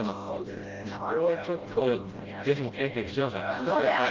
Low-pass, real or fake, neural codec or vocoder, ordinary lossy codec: 7.2 kHz; fake; codec, 16 kHz, 0.5 kbps, FreqCodec, smaller model; Opus, 24 kbps